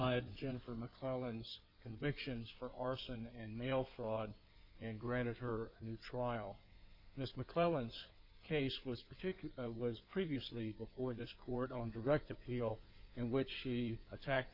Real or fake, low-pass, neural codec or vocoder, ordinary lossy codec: fake; 5.4 kHz; codec, 16 kHz in and 24 kHz out, 2.2 kbps, FireRedTTS-2 codec; Opus, 64 kbps